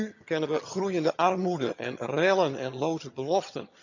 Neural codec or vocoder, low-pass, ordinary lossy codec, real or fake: vocoder, 22.05 kHz, 80 mel bands, HiFi-GAN; 7.2 kHz; none; fake